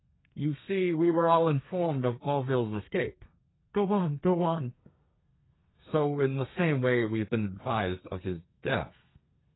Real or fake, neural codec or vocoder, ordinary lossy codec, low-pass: fake; codec, 32 kHz, 1.9 kbps, SNAC; AAC, 16 kbps; 7.2 kHz